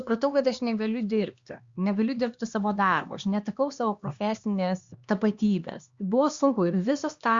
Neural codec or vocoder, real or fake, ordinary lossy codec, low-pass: codec, 16 kHz, 2 kbps, X-Codec, HuBERT features, trained on LibriSpeech; fake; Opus, 64 kbps; 7.2 kHz